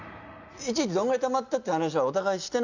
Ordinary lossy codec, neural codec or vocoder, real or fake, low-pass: none; none; real; 7.2 kHz